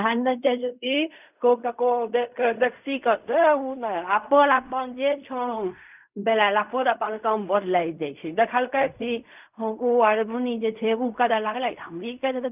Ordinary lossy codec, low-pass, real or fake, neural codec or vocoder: none; 3.6 kHz; fake; codec, 16 kHz in and 24 kHz out, 0.4 kbps, LongCat-Audio-Codec, fine tuned four codebook decoder